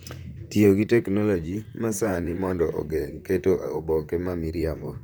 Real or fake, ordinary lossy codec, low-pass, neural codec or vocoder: fake; none; none; vocoder, 44.1 kHz, 128 mel bands, Pupu-Vocoder